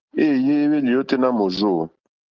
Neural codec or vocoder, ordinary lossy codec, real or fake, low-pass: none; Opus, 16 kbps; real; 7.2 kHz